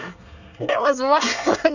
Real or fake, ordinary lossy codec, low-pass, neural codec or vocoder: fake; none; 7.2 kHz; codec, 24 kHz, 1 kbps, SNAC